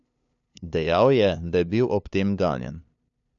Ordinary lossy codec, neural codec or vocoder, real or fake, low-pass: none; codec, 16 kHz, 2 kbps, FunCodec, trained on LibriTTS, 25 frames a second; fake; 7.2 kHz